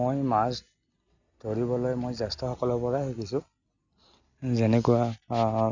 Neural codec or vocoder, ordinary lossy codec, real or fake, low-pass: none; none; real; 7.2 kHz